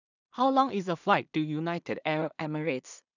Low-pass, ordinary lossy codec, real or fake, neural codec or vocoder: 7.2 kHz; none; fake; codec, 16 kHz in and 24 kHz out, 0.4 kbps, LongCat-Audio-Codec, two codebook decoder